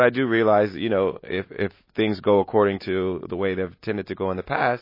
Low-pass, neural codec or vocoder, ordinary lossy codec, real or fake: 5.4 kHz; none; MP3, 24 kbps; real